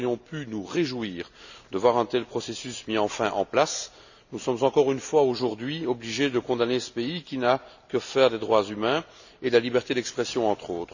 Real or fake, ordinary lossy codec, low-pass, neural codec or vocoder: real; none; 7.2 kHz; none